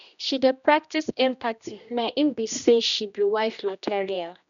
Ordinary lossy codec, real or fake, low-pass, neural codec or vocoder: none; fake; 7.2 kHz; codec, 16 kHz, 1 kbps, X-Codec, HuBERT features, trained on general audio